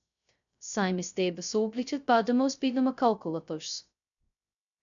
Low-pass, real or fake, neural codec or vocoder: 7.2 kHz; fake; codec, 16 kHz, 0.2 kbps, FocalCodec